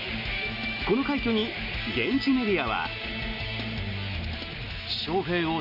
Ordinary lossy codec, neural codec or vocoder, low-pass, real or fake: none; none; 5.4 kHz; real